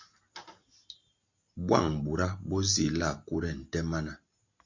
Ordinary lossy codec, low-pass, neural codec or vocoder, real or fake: MP3, 48 kbps; 7.2 kHz; none; real